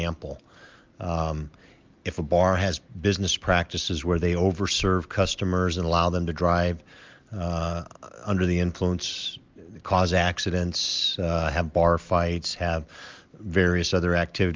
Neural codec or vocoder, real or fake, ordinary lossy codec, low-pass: none; real; Opus, 32 kbps; 7.2 kHz